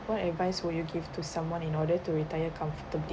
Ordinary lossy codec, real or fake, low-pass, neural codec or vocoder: none; real; none; none